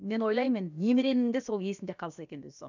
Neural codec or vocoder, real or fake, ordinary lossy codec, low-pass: codec, 16 kHz, about 1 kbps, DyCAST, with the encoder's durations; fake; none; 7.2 kHz